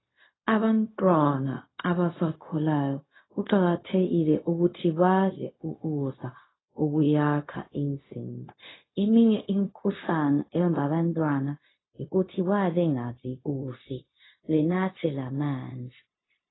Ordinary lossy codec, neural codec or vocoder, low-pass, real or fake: AAC, 16 kbps; codec, 16 kHz, 0.4 kbps, LongCat-Audio-Codec; 7.2 kHz; fake